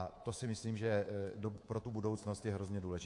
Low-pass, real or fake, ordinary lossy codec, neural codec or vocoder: 10.8 kHz; fake; AAC, 48 kbps; codec, 24 kHz, 3.1 kbps, DualCodec